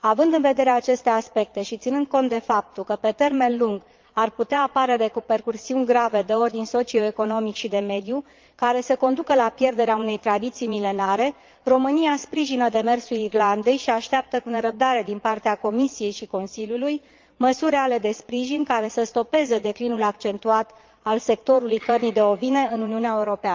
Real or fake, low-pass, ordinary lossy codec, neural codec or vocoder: fake; 7.2 kHz; Opus, 24 kbps; vocoder, 22.05 kHz, 80 mel bands, Vocos